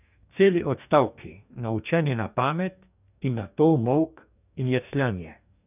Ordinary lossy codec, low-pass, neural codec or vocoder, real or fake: none; 3.6 kHz; codec, 44.1 kHz, 2.6 kbps, DAC; fake